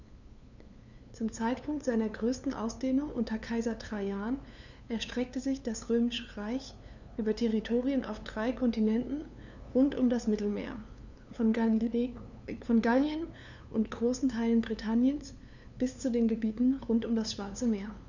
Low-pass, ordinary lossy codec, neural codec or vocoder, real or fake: 7.2 kHz; none; codec, 16 kHz, 2 kbps, FunCodec, trained on LibriTTS, 25 frames a second; fake